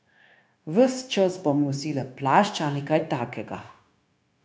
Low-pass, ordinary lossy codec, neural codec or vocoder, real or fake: none; none; codec, 16 kHz, 0.9 kbps, LongCat-Audio-Codec; fake